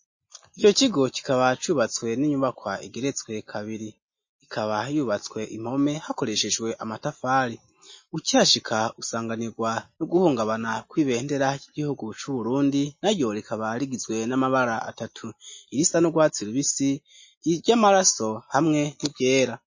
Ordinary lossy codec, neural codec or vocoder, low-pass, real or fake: MP3, 32 kbps; none; 7.2 kHz; real